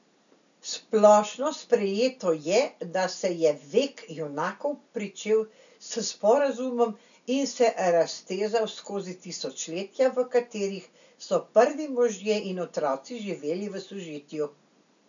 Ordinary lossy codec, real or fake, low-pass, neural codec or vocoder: none; real; 7.2 kHz; none